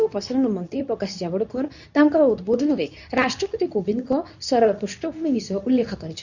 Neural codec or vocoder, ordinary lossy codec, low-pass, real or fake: codec, 24 kHz, 0.9 kbps, WavTokenizer, medium speech release version 2; none; 7.2 kHz; fake